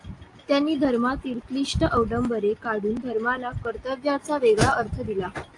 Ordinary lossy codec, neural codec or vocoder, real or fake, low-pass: AAC, 48 kbps; none; real; 10.8 kHz